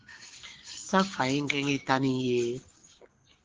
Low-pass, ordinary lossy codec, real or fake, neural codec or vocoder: 7.2 kHz; Opus, 16 kbps; fake; codec, 16 kHz, 6 kbps, DAC